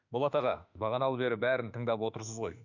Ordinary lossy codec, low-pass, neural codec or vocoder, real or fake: none; 7.2 kHz; autoencoder, 48 kHz, 32 numbers a frame, DAC-VAE, trained on Japanese speech; fake